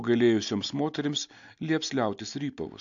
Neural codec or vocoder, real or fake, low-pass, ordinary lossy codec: none; real; 7.2 kHz; AAC, 64 kbps